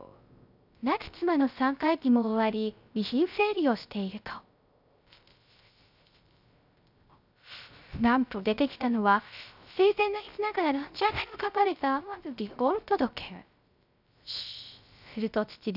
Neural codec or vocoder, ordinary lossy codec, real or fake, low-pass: codec, 16 kHz, 0.3 kbps, FocalCodec; none; fake; 5.4 kHz